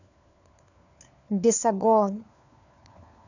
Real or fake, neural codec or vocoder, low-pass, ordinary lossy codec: fake; codec, 16 kHz, 4 kbps, FunCodec, trained on LibriTTS, 50 frames a second; 7.2 kHz; none